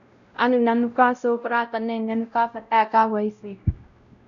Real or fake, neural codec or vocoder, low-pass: fake; codec, 16 kHz, 0.5 kbps, X-Codec, WavLM features, trained on Multilingual LibriSpeech; 7.2 kHz